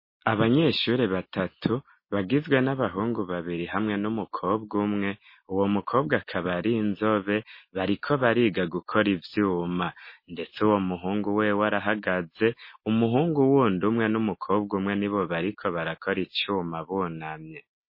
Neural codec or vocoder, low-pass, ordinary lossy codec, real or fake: none; 5.4 kHz; MP3, 24 kbps; real